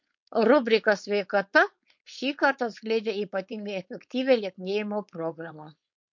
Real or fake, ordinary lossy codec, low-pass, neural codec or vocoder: fake; MP3, 48 kbps; 7.2 kHz; codec, 16 kHz, 4.8 kbps, FACodec